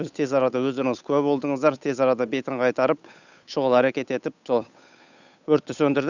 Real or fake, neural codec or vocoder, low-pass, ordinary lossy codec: fake; codec, 16 kHz, 8 kbps, FunCodec, trained on Chinese and English, 25 frames a second; 7.2 kHz; none